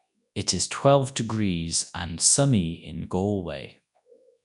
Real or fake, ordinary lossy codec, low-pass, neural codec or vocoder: fake; none; 10.8 kHz; codec, 24 kHz, 0.9 kbps, WavTokenizer, large speech release